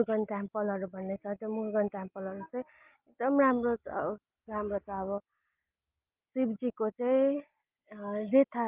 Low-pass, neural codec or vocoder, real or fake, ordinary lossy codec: 3.6 kHz; none; real; Opus, 32 kbps